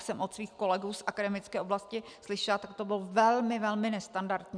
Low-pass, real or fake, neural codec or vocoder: 9.9 kHz; real; none